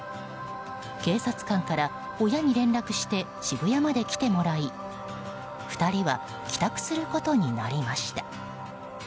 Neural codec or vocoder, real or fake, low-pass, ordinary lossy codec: none; real; none; none